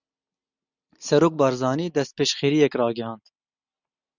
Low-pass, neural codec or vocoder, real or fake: 7.2 kHz; none; real